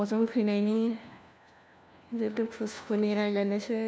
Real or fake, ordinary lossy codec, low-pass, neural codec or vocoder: fake; none; none; codec, 16 kHz, 1 kbps, FunCodec, trained on LibriTTS, 50 frames a second